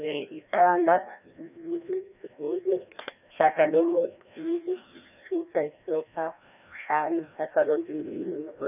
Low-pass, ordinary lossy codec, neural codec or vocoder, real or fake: 3.6 kHz; none; codec, 16 kHz, 1 kbps, FreqCodec, larger model; fake